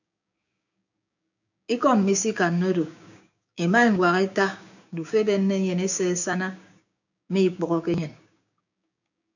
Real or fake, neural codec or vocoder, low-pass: fake; codec, 16 kHz, 6 kbps, DAC; 7.2 kHz